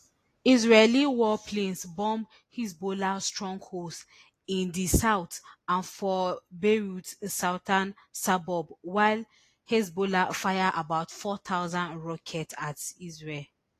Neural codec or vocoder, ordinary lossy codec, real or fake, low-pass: none; AAC, 48 kbps; real; 14.4 kHz